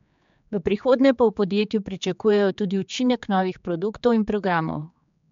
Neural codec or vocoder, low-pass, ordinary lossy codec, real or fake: codec, 16 kHz, 4 kbps, X-Codec, HuBERT features, trained on general audio; 7.2 kHz; MP3, 64 kbps; fake